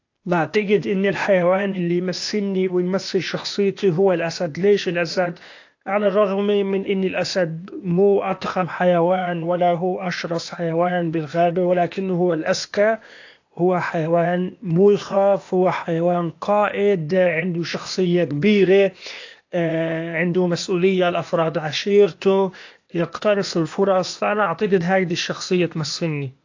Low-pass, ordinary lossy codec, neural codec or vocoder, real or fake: 7.2 kHz; AAC, 48 kbps; codec, 16 kHz, 0.8 kbps, ZipCodec; fake